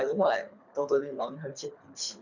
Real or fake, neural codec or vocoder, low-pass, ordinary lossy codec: fake; codec, 24 kHz, 3 kbps, HILCodec; 7.2 kHz; none